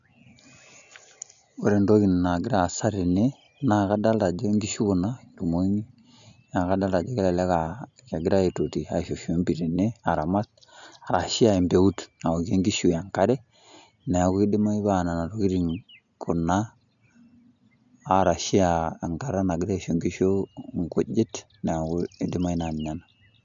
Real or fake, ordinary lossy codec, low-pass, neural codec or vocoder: real; none; 7.2 kHz; none